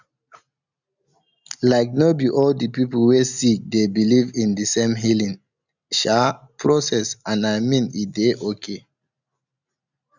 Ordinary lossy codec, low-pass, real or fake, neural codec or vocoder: none; 7.2 kHz; real; none